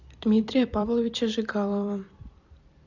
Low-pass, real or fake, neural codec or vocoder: 7.2 kHz; fake; vocoder, 44.1 kHz, 128 mel bands every 256 samples, BigVGAN v2